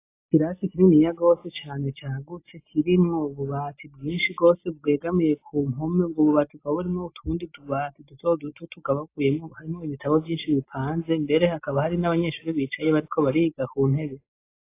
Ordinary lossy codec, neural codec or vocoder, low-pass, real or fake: AAC, 24 kbps; none; 3.6 kHz; real